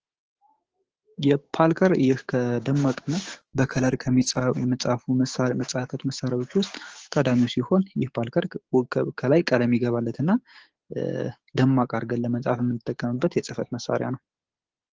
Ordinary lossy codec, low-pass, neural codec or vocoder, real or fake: Opus, 16 kbps; 7.2 kHz; codec, 16 kHz, 6 kbps, DAC; fake